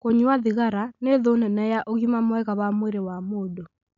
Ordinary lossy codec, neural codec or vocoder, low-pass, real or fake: MP3, 96 kbps; none; 7.2 kHz; real